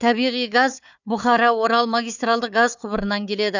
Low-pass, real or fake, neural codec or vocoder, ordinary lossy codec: 7.2 kHz; fake; codec, 16 kHz, 4 kbps, FunCodec, trained on Chinese and English, 50 frames a second; none